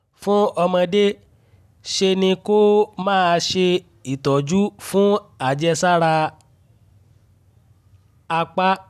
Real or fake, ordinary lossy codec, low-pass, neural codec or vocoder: real; none; 14.4 kHz; none